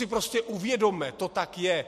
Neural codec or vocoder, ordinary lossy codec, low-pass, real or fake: none; MP3, 64 kbps; 14.4 kHz; real